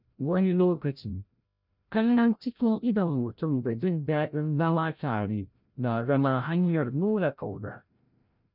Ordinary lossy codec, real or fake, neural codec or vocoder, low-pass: none; fake; codec, 16 kHz, 0.5 kbps, FreqCodec, larger model; 5.4 kHz